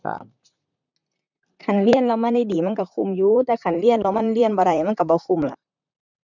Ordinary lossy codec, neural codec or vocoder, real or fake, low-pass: none; vocoder, 44.1 kHz, 128 mel bands, Pupu-Vocoder; fake; 7.2 kHz